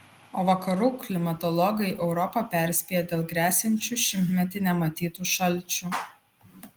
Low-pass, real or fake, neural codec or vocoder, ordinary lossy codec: 19.8 kHz; real; none; Opus, 24 kbps